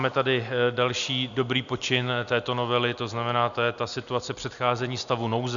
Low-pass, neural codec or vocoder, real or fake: 7.2 kHz; none; real